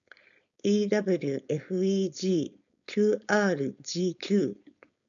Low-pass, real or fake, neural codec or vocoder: 7.2 kHz; fake; codec, 16 kHz, 4.8 kbps, FACodec